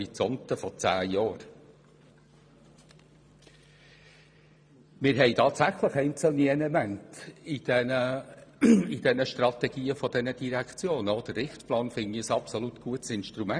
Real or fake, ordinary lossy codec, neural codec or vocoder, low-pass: fake; none; vocoder, 44.1 kHz, 128 mel bands every 512 samples, BigVGAN v2; 9.9 kHz